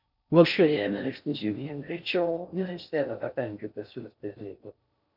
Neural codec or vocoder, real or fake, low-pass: codec, 16 kHz in and 24 kHz out, 0.6 kbps, FocalCodec, streaming, 4096 codes; fake; 5.4 kHz